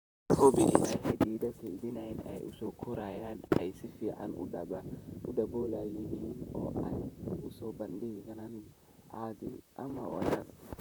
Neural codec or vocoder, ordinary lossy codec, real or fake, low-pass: vocoder, 44.1 kHz, 128 mel bands, Pupu-Vocoder; none; fake; none